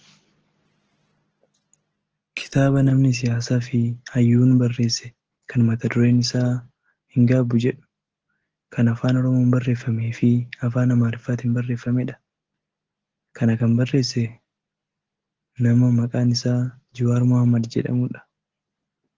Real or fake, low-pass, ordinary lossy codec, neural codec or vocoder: real; 7.2 kHz; Opus, 16 kbps; none